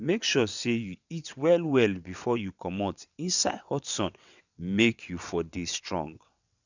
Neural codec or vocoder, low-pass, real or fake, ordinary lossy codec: vocoder, 22.05 kHz, 80 mel bands, WaveNeXt; 7.2 kHz; fake; none